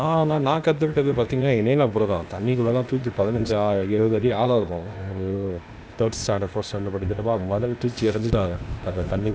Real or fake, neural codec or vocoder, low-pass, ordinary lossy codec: fake; codec, 16 kHz, 0.8 kbps, ZipCodec; none; none